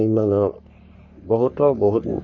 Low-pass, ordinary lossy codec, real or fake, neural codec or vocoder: 7.2 kHz; none; fake; codec, 44.1 kHz, 3.4 kbps, Pupu-Codec